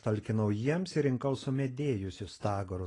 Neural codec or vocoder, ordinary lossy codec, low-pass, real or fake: none; AAC, 32 kbps; 10.8 kHz; real